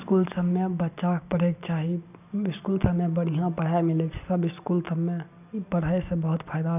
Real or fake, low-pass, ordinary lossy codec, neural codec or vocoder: real; 3.6 kHz; none; none